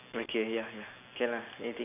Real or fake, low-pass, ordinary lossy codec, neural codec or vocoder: real; 3.6 kHz; none; none